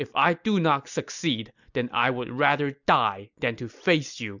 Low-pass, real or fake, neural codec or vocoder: 7.2 kHz; real; none